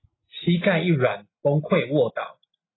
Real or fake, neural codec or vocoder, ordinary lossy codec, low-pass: real; none; AAC, 16 kbps; 7.2 kHz